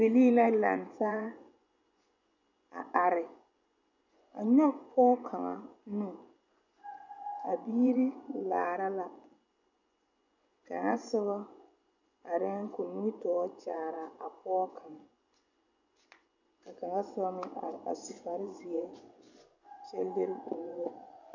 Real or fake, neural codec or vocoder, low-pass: fake; vocoder, 24 kHz, 100 mel bands, Vocos; 7.2 kHz